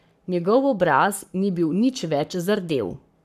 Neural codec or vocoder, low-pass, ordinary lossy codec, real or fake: codec, 44.1 kHz, 7.8 kbps, Pupu-Codec; 14.4 kHz; none; fake